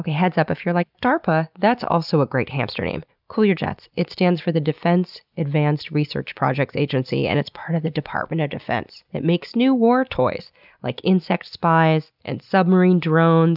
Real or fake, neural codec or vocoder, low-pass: real; none; 5.4 kHz